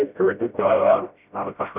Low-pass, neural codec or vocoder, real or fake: 3.6 kHz; codec, 16 kHz, 0.5 kbps, FreqCodec, smaller model; fake